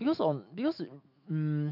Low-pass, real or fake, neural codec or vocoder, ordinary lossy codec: 5.4 kHz; fake; autoencoder, 48 kHz, 128 numbers a frame, DAC-VAE, trained on Japanese speech; AAC, 48 kbps